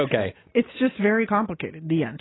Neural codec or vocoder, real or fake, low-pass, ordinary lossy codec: none; real; 7.2 kHz; AAC, 16 kbps